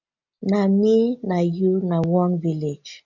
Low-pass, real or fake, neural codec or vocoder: 7.2 kHz; real; none